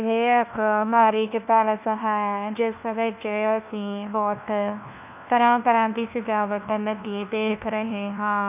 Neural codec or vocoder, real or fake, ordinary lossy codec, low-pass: codec, 16 kHz, 1 kbps, FunCodec, trained on LibriTTS, 50 frames a second; fake; none; 3.6 kHz